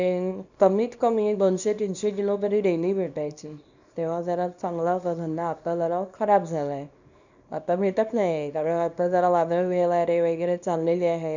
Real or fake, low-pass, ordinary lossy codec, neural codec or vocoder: fake; 7.2 kHz; none; codec, 24 kHz, 0.9 kbps, WavTokenizer, small release